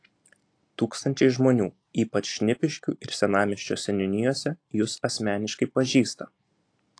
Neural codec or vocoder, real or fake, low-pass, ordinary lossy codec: none; real; 9.9 kHz; AAC, 48 kbps